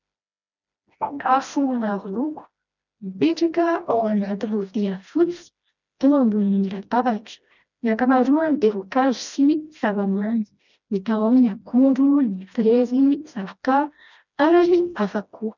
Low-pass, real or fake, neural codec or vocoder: 7.2 kHz; fake; codec, 16 kHz, 1 kbps, FreqCodec, smaller model